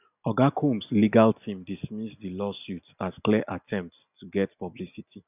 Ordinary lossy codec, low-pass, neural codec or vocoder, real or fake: none; 3.6 kHz; none; real